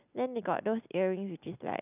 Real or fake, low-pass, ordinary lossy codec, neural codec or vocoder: real; 3.6 kHz; none; none